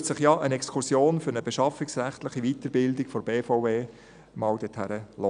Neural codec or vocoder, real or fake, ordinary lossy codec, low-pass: none; real; none; 9.9 kHz